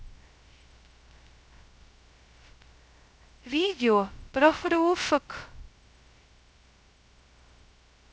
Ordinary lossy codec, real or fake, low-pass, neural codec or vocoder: none; fake; none; codec, 16 kHz, 0.2 kbps, FocalCodec